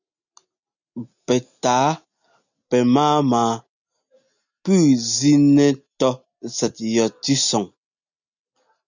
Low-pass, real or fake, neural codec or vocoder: 7.2 kHz; real; none